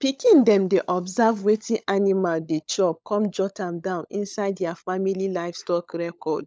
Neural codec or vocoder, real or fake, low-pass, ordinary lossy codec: codec, 16 kHz, 16 kbps, FunCodec, trained on LibriTTS, 50 frames a second; fake; none; none